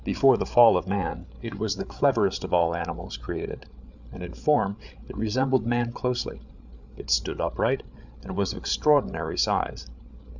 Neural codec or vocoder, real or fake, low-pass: codec, 16 kHz, 8 kbps, FreqCodec, larger model; fake; 7.2 kHz